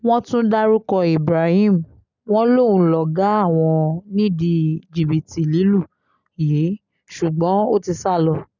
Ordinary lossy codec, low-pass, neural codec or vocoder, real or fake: none; 7.2 kHz; none; real